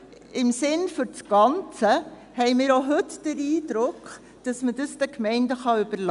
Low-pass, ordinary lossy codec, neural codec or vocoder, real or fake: 10.8 kHz; none; none; real